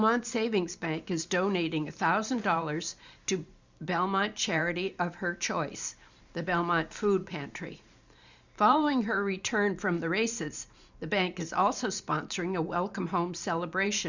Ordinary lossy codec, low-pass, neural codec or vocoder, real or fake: Opus, 64 kbps; 7.2 kHz; vocoder, 44.1 kHz, 128 mel bands every 512 samples, BigVGAN v2; fake